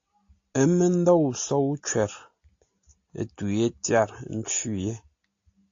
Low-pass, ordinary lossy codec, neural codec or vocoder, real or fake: 7.2 kHz; AAC, 48 kbps; none; real